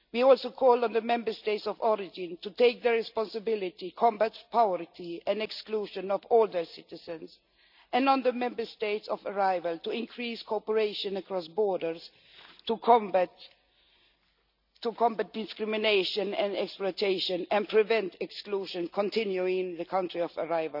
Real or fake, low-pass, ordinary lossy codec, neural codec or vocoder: real; 5.4 kHz; none; none